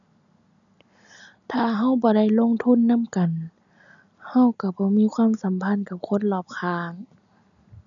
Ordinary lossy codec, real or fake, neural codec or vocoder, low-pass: none; real; none; 7.2 kHz